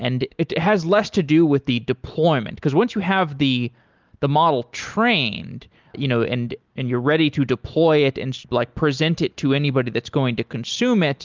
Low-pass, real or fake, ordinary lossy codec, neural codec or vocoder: 7.2 kHz; real; Opus, 32 kbps; none